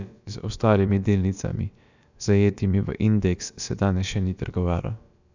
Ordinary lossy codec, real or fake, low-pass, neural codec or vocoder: none; fake; 7.2 kHz; codec, 16 kHz, about 1 kbps, DyCAST, with the encoder's durations